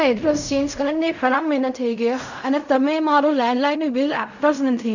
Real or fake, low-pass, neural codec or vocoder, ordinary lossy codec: fake; 7.2 kHz; codec, 16 kHz in and 24 kHz out, 0.4 kbps, LongCat-Audio-Codec, fine tuned four codebook decoder; none